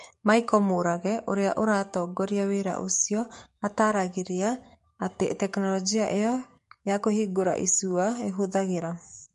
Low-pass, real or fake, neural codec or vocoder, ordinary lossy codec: 14.4 kHz; fake; codec, 44.1 kHz, 7.8 kbps, DAC; MP3, 48 kbps